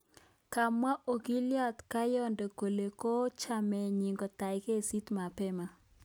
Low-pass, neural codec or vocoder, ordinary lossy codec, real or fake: none; none; none; real